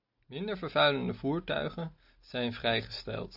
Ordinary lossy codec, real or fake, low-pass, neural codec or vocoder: AAC, 48 kbps; real; 5.4 kHz; none